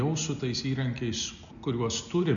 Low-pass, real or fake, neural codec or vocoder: 7.2 kHz; real; none